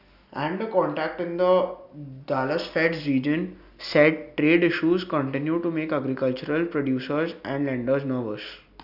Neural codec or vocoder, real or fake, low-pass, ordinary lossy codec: none; real; 5.4 kHz; none